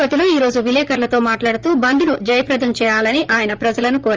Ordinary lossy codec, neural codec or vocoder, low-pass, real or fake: Opus, 24 kbps; vocoder, 44.1 kHz, 128 mel bands, Pupu-Vocoder; 7.2 kHz; fake